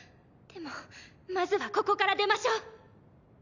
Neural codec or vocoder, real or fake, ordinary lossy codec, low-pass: none; real; none; 7.2 kHz